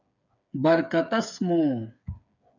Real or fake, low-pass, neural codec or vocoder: fake; 7.2 kHz; codec, 16 kHz, 8 kbps, FreqCodec, smaller model